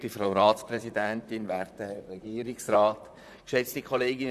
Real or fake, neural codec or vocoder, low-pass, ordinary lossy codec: fake; vocoder, 44.1 kHz, 128 mel bands, Pupu-Vocoder; 14.4 kHz; none